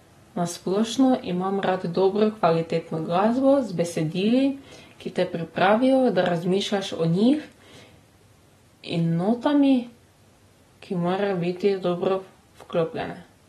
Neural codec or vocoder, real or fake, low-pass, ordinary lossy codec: none; real; 14.4 kHz; AAC, 32 kbps